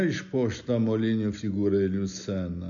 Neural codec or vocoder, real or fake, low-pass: none; real; 7.2 kHz